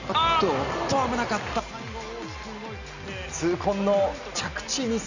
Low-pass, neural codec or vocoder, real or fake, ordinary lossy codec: 7.2 kHz; none; real; none